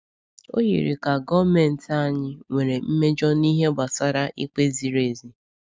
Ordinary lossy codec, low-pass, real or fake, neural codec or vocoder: none; none; real; none